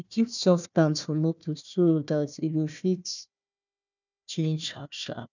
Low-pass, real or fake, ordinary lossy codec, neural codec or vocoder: 7.2 kHz; fake; none; codec, 16 kHz, 1 kbps, FunCodec, trained on Chinese and English, 50 frames a second